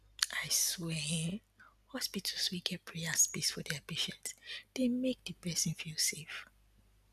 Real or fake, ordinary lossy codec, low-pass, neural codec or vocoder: real; none; 14.4 kHz; none